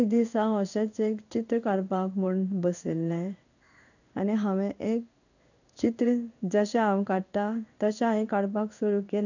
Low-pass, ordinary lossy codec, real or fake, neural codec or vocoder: 7.2 kHz; none; fake; codec, 16 kHz in and 24 kHz out, 1 kbps, XY-Tokenizer